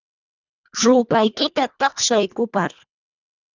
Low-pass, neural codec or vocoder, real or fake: 7.2 kHz; codec, 24 kHz, 1.5 kbps, HILCodec; fake